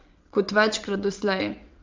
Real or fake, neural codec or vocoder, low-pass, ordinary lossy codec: fake; vocoder, 22.05 kHz, 80 mel bands, WaveNeXt; 7.2 kHz; Opus, 32 kbps